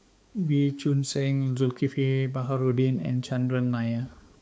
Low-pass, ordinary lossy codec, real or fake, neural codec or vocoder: none; none; fake; codec, 16 kHz, 4 kbps, X-Codec, HuBERT features, trained on balanced general audio